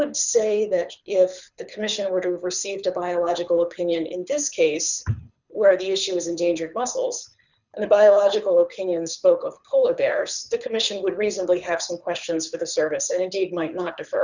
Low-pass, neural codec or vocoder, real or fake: 7.2 kHz; codec, 24 kHz, 6 kbps, HILCodec; fake